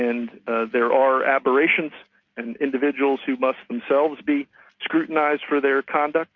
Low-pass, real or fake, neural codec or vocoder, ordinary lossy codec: 7.2 kHz; real; none; MP3, 48 kbps